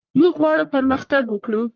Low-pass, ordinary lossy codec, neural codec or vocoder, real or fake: 7.2 kHz; Opus, 32 kbps; codec, 44.1 kHz, 1.7 kbps, Pupu-Codec; fake